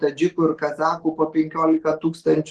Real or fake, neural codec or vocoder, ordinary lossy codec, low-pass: real; none; Opus, 16 kbps; 10.8 kHz